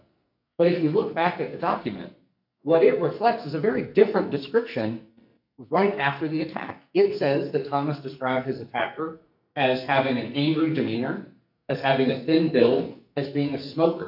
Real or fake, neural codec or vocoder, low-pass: fake; codec, 44.1 kHz, 2.6 kbps, SNAC; 5.4 kHz